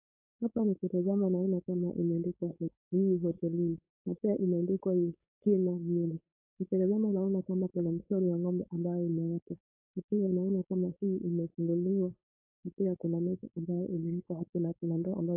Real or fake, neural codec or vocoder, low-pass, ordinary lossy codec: fake; codec, 16 kHz, 4.8 kbps, FACodec; 3.6 kHz; AAC, 32 kbps